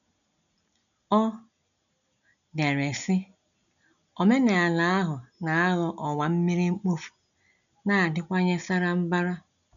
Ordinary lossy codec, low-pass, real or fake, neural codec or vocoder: none; 7.2 kHz; real; none